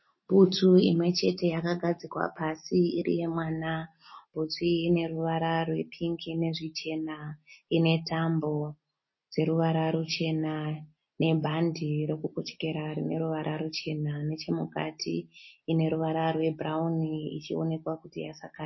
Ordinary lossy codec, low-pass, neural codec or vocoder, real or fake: MP3, 24 kbps; 7.2 kHz; none; real